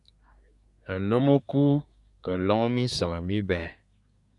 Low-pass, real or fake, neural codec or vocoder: 10.8 kHz; fake; codec, 24 kHz, 1 kbps, SNAC